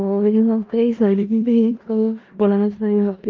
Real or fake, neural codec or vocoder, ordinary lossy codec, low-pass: fake; codec, 16 kHz in and 24 kHz out, 0.4 kbps, LongCat-Audio-Codec, four codebook decoder; Opus, 24 kbps; 7.2 kHz